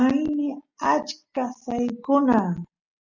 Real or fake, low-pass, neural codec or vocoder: real; 7.2 kHz; none